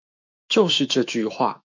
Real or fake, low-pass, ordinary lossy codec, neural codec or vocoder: fake; 7.2 kHz; MP3, 64 kbps; autoencoder, 48 kHz, 128 numbers a frame, DAC-VAE, trained on Japanese speech